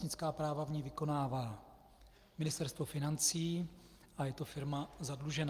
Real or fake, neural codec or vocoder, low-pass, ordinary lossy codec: real; none; 14.4 kHz; Opus, 32 kbps